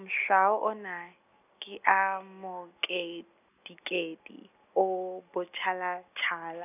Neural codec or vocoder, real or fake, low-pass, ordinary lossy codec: none; real; 3.6 kHz; none